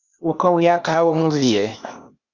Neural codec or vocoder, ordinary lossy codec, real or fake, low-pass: codec, 16 kHz, 0.8 kbps, ZipCodec; Opus, 64 kbps; fake; 7.2 kHz